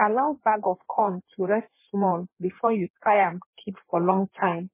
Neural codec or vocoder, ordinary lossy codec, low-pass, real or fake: codec, 16 kHz in and 24 kHz out, 1.1 kbps, FireRedTTS-2 codec; MP3, 16 kbps; 3.6 kHz; fake